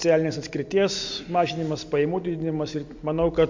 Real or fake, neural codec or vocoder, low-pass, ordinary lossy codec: real; none; 7.2 kHz; MP3, 64 kbps